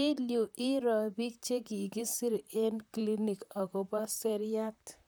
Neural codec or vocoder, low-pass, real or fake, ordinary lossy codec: vocoder, 44.1 kHz, 128 mel bands, Pupu-Vocoder; none; fake; none